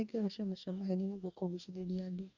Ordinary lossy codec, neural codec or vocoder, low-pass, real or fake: none; codec, 44.1 kHz, 2.6 kbps, DAC; 7.2 kHz; fake